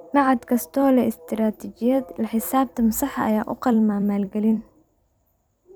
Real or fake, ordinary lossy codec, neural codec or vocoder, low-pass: fake; none; vocoder, 44.1 kHz, 128 mel bands every 256 samples, BigVGAN v2; none